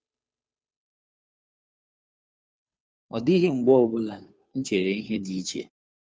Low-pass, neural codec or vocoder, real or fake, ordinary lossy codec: none; codec, 16 kHz, 2 kbps, FunCodec, trained on Chinese and English, 25 frames a second; fake; none